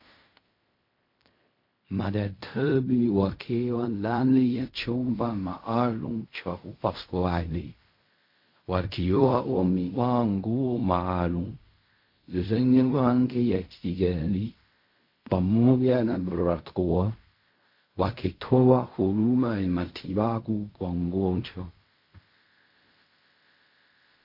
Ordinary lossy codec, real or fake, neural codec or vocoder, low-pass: MP3, 32 kbps; fake; codec, 16 kHz in and 24 kHz out, 0.4 kbps, LongCat-Audio-Codec, fine tuned four codebook decoder; 5.4 kHz